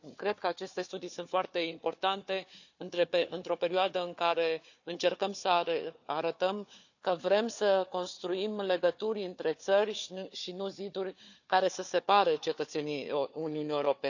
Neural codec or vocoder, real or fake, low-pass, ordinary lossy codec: codec, 16 kHz, 4 kbps, FunCodec, trained on Chinese and English, 50 frames a second; fake; 7.2 kHz; none